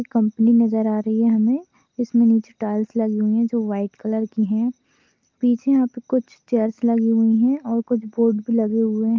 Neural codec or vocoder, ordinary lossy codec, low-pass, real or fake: none; Opus, 24 kbps; 7.2 kHz; real